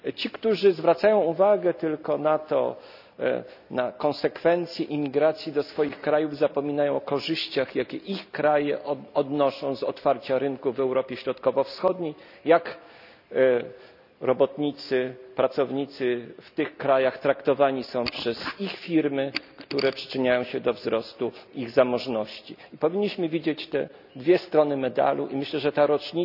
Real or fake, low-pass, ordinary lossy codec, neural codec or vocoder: real; 5.4 kHz; none; none